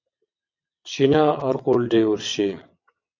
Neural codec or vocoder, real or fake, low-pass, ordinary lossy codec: vocoder, 22.05 kHz, 80 mel bands, WaveNeXt; fake; 7.2 kHz; MP3, 64 kbps